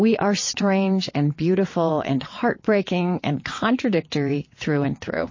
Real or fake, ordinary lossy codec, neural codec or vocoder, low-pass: fake; MP3, 32 kbps; vocoder, 22.05 kHz, 80 mel bands, WaveNeXt; 7.2 kHz